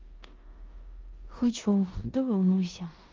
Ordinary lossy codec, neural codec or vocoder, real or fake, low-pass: Opus, 32 kbps; codec, 16 kHz in and 24 kHz out, 0.9 kbps, LongCat-Audio-Codec, four codebook decoder; fake; 7.2 kHz